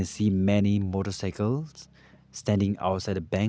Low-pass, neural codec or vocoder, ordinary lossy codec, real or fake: none; none; none; real